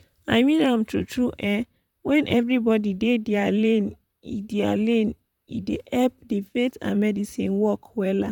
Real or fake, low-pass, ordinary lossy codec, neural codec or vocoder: fake; 19.8 kHz; none; vocoder, 44.1 kHz, 128 mel bands, Pupu-Vocoder